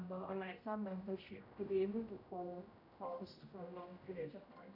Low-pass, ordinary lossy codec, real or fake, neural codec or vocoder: 5.4 kHz; none; fake; codec, 16 kHz, 0.5 kbps, X-Codec, HuBERT features, trained on general audio